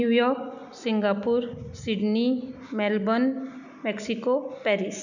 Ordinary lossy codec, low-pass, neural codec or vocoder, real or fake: none; 7.2 kHz; none; real